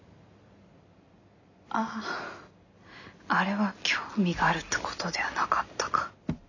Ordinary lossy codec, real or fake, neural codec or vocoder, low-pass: none; real; none; 7.2 kHz